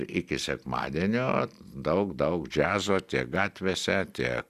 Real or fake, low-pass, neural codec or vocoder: real; 14.4 kHz; none